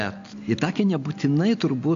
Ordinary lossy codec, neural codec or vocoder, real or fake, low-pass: AAC, 64 kbps; none; real; 7.2 kHz